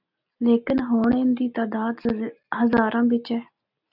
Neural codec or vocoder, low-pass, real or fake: none; 5.4 kHz; real